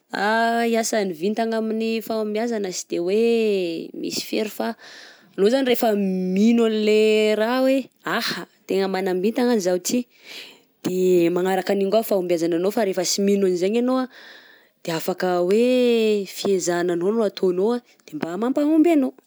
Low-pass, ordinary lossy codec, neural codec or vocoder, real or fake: none; none; none; real